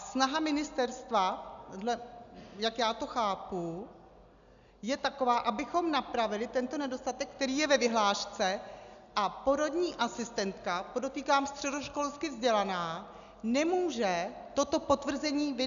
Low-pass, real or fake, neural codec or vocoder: 7.2 kHz; real; none